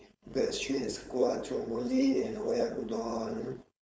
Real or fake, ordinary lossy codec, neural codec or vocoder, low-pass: fake; none; codec, 16 kHz, 4.8 kbps, FACodec; none